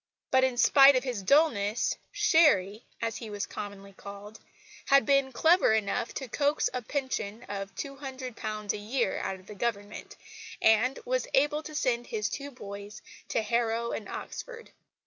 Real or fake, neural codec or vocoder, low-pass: real; none; 7.2 kHz